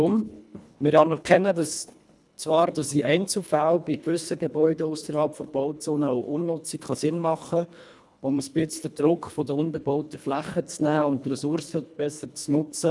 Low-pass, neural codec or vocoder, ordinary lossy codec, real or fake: none; codec, 24 kHz, 1.5 kbps, HILCodec; none; fake